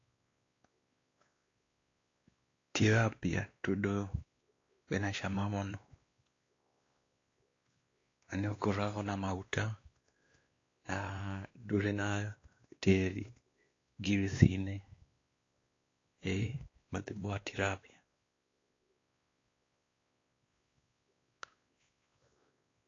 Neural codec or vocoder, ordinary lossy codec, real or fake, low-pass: codec, 16 kHz, 2 kbps, X-Codec, WavLM features, trained on Multilingual LibriSpeech; AAC, 32 kbps; fake; 7.2 kHz